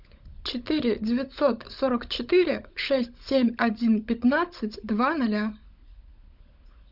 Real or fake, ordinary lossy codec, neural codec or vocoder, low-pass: fake; Opus, 64 kbps; codec, 16 kHz, 16 kbps, FunCodec, trained on LibriTTS, 50 frames a second; 5.4 kHz